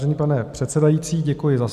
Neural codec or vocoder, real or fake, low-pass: none; real; 14.4 kHz